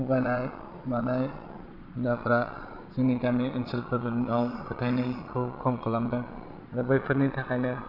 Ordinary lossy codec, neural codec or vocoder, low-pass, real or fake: none; vocoder, 22.05 kHz, 80 mel bands, Vocos; 5.4 kHz; fake